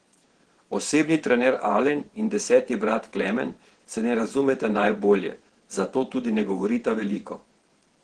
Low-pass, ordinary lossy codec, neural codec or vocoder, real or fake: 9.9 kHz; Opus, 16 kbps; vocoder, 22.05 kHz, 80 mel bands, WaveNeXt; fake